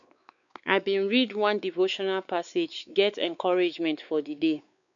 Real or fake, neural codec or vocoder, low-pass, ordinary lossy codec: fake; codec, 16 kHz, 4 kbps, X-Codec, WavLM features, trained on Multilingual LibriSpeech; 7.2 kHz; none